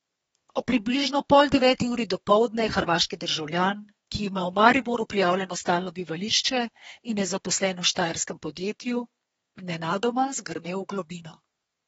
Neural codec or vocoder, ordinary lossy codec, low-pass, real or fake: codec, 32 kHz, 1.9 kbps, SNAC; AAC, 24 kbps; 14.4 kHz; fake